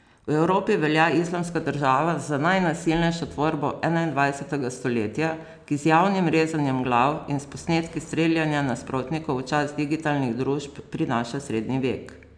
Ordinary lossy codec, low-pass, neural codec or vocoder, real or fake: none; 9.9 kHz; none; real